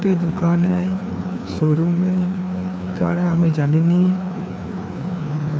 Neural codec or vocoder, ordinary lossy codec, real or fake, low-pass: codec, 16 kHz, 2 kbps, FreqCodec, larger model; none; fake; none